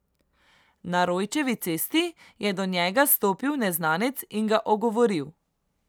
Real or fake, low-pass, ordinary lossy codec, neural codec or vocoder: real; none; none; none